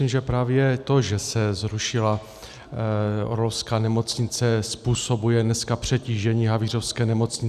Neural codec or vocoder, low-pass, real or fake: none; 14.4 kHz; real